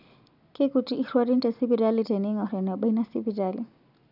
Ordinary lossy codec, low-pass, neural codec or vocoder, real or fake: none; 5.4 kHz; none; real